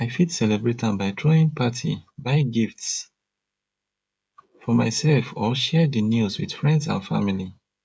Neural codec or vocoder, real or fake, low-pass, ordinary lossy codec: codec, 16 kHz, 16 kbps, FreqCodec, smaller model; fake; none; none